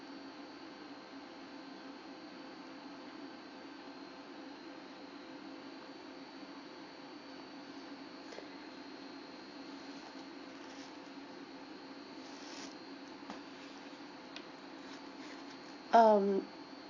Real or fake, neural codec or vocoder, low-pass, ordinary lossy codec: real; none; 7.2 kHz; AAC, 32 kbps